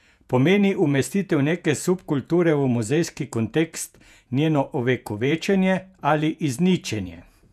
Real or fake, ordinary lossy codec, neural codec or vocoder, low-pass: fake; none; vocoder, 48 kHz, 128 mel bands, Vocos; 14.4 kHz